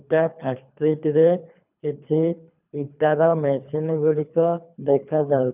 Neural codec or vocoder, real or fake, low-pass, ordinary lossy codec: codec, 24 kHz, 3 kbps, HILCodec; fake; 3.6 kHz; none